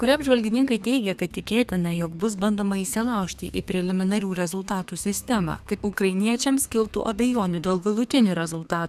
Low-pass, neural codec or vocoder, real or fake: 14.4 kHz; codec, 32 kHz, 1.9 kbps, SNAC; fake